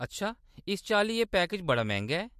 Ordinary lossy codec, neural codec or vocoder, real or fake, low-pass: MP3, 64 kbps; none; real; 14.4 kHz